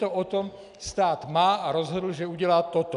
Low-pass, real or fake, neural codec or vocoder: 10.8 kHz; real; none